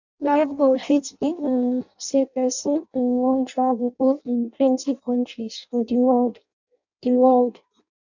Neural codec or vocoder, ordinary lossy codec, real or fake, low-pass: codec, 16 kHz in and 24 kHz out, 0.6 kbps, FireRedTTS-2 codec; none; fake; 7.2 kHz